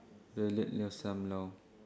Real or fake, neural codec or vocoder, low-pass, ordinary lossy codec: real; none; none; none